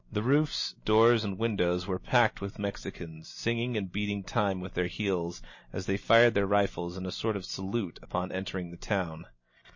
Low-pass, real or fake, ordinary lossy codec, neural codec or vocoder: 7.2 kHz; real; MP3, 32 kbps; none